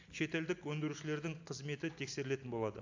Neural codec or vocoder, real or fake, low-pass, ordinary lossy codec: none; real; 7.2 kHz; AAC, 48 kbps